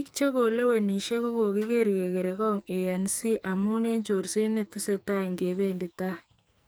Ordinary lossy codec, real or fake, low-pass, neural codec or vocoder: none; fake; none; codec, 44.1 kHz, 2.6 kbps, SNAC